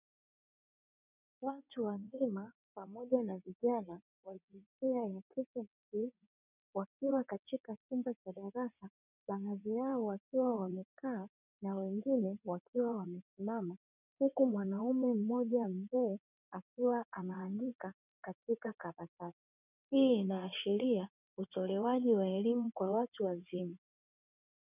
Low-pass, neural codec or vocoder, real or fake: 3.6 kHz; vocoder, 22.05 kHz, 80 mel bands, WaveNeXt; fake